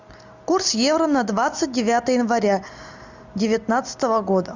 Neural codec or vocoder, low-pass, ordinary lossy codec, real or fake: none; 7.2 kHz; Opus, 64 kbps; real